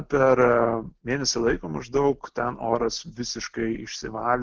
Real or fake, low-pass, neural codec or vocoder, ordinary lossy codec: real; 7.2 kHz; none; Opus, 16 kbps